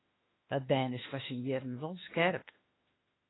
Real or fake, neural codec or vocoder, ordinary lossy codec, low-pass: fake; autoencoder, 48 kHz, 32 numbers a frame, DAC-VAE, trained on Japanese speech; AAC, 16 kbps; 7.2 kHz